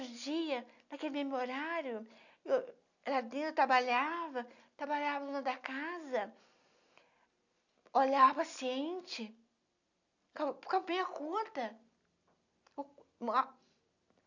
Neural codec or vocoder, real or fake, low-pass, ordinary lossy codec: none; real; 7.2 kHz; none